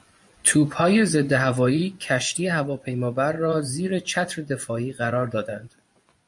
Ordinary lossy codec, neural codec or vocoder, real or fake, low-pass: MP3, 64 kbps; vocoder, 24 kHz, 100 mel bands, Vocos; fake; 10.8 kHz